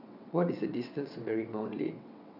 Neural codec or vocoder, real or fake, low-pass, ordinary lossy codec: vocoder, 22.05 kHz, 80 mel bands, WaveNeXt; fake; 5.4 kHz; none